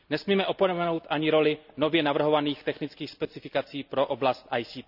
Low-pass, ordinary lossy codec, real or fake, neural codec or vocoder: 5.4 kHz; none; real; none